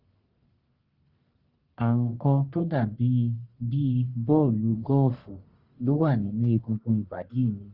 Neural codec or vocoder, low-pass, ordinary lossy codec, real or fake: codec, 44.1 kHz, 1.7 kbps, Pupu-Codec; 5.4 kHz; Opus, 32 kbps; fake